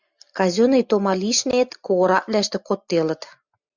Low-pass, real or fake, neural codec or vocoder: 7.2 kHz; real; none